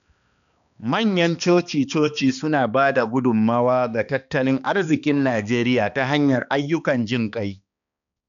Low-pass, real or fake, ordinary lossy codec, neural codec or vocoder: 7.2 kHz; fake; none; codec, 16 kHz, 2 kbps, X-Codec, HuBERT features, trained on balanced general audio